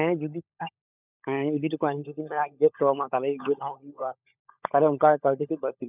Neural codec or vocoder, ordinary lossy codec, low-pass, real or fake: codec, 16 kHz, 16 kbps, FunCodec, trained on LibriTTS, 50 frames a second; none; 3.6 kHz; fake